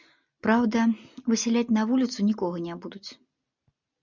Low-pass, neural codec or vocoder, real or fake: 7.2 kHz; none; real